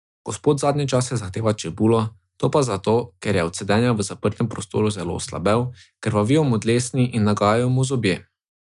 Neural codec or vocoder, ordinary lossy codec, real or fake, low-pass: none; none; real; 10.8 kHz